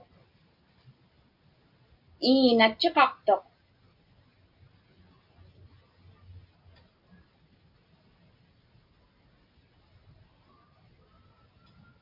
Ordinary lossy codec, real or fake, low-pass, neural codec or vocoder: MP3, 48 kbps; real; 5.4 kHz; none